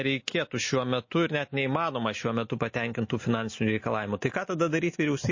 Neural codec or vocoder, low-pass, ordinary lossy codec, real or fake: none; 7.2 kHz; MP3, 32 kbps; real